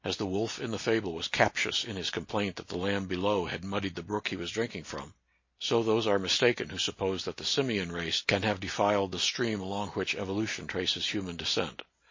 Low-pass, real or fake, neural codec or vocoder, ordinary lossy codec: 7.2 kHz; real; none; MP3, 32 kbps